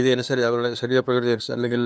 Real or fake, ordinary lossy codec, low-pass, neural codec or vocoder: fake; none; none; codec, 16 kHz, 2 kbps, FunCodec, trained on LibriTTS, 25 frames a second